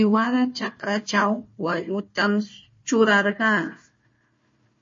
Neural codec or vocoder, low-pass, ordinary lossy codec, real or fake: codec, 16 kHz, 1 kbps, FunCodec, trained on Chinese and English, 50 frames a second; 7.2 kHz; MP3, 32 kbps; fake